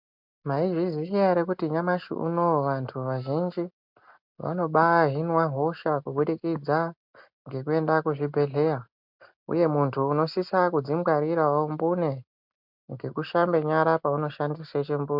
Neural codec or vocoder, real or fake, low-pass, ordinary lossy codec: none; real; 5.4 kHz; MP3, 48 kbps